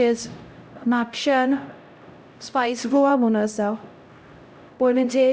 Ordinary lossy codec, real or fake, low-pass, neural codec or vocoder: none; fake; none; codec, 16 kHz, 0.5 kbps, X-Codec, HuBERT features, trained on LibriSpeech